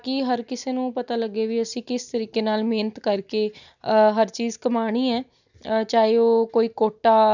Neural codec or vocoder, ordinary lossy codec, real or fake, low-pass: none; none; real; 7.2 kHz